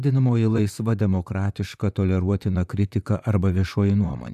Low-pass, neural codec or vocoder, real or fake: 14.4 kHz; vocoder, 44.1 kHz, 128 mel bands, Pupu-Vocoder; fake